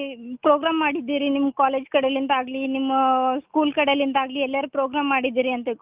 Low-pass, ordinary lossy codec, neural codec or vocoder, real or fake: 3.6 kHz; Opus, 24 kbps; none; real